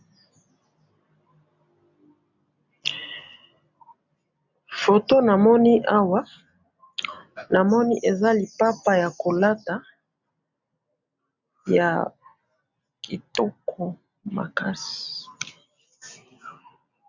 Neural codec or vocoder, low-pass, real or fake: none; 7.2 kHz; real